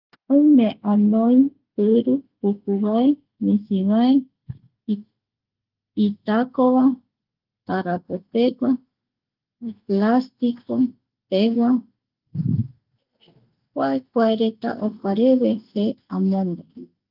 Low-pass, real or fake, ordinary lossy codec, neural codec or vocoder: 5.4 kHz; real; Opus, 32 kbps; none